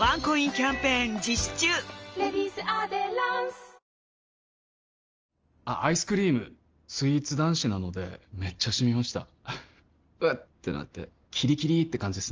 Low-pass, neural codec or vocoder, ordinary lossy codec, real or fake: 7.2 kHz; none; Opus, 24 kbps; real